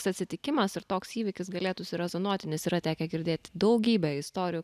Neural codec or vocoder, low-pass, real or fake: none; 14.4 kHz; real